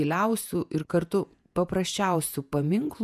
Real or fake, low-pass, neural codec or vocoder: fake; 14.4 kHz; vocoder, 48 kHz, 128 mel bands, Vocos